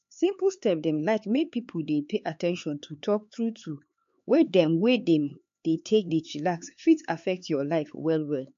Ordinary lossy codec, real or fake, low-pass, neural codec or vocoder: MP3, 48 kbps; fake; 7.2 kHz; codec, 16 kHz, 4 kbps, X-Codec, HuBERT features, trained on LibriSpeech